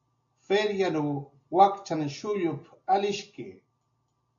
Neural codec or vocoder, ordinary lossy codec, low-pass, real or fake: none; AAC, 64 kbps; 7.2 kHz; real